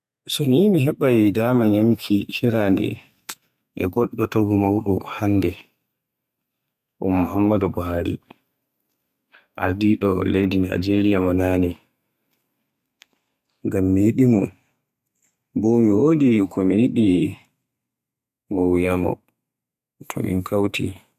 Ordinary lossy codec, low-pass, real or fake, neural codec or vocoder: none; 14.4 kHz; fake; codec, 32 kHz, 1.9 kbps, SNAC